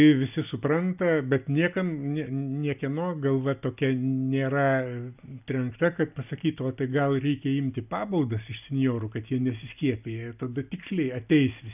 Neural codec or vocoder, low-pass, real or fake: none; 3.6 kHz; real